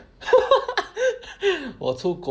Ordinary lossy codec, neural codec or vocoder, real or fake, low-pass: none; none; real; none